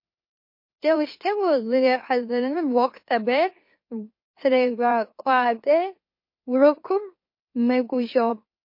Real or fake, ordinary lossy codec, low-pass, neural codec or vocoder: fake; MP3, 32 kbps; 5.4 kHz; autoencoder, 44.1 kHz, a latent of 192 numbers a frame, MeloTTS